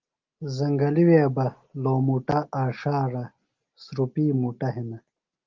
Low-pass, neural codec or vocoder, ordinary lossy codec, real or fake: 7.2 kHz; none; Opus, 32 kbps; real